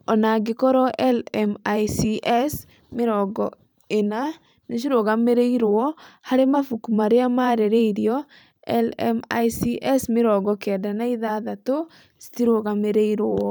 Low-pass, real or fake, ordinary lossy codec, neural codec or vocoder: none; fake; none; vocoder, 44.1 kHz, 128 mel bands every 512 samples, BigVGAN v2